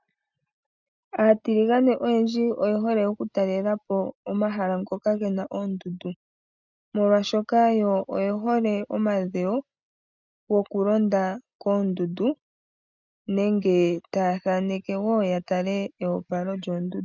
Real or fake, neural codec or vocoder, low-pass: real; none; 7.2 kHz